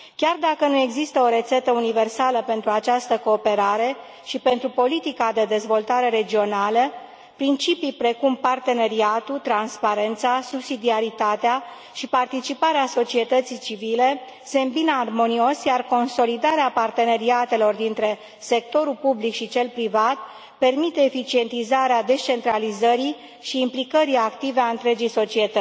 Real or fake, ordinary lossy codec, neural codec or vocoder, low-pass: real; none; none; none